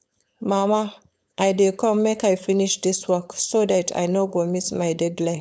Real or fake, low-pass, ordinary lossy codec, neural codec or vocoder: fake; none; none; codec, 16 kHz, 4.8 kbps, FACodec